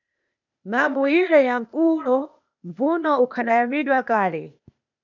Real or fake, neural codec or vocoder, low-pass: fake; codec, 16 kHz, 0.8 kbps, ZipCodec; 7.2 kHz